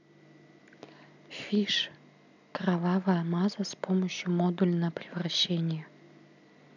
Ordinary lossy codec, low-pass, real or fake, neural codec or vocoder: none; 7.2 kHz; real; none